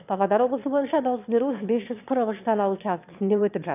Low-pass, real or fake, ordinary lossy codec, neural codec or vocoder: 3.6 kHz; fake; none; autoencoder, 22.05 kHz, a latent of 192 numbers a frame, VITS, trained on one speaker